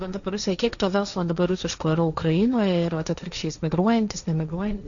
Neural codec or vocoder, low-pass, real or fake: codec, 16 kHz, 1.1 kbps, Voila-Tokenizer; 7.2 kHz; fake